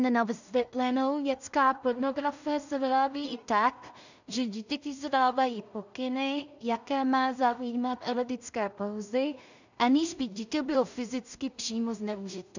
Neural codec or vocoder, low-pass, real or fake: codec, 16 kHz in and 24 kHz out, 0.4 kbps, LongCat-Audio-Codec, two codebook decoder; 7.2 kHz; fake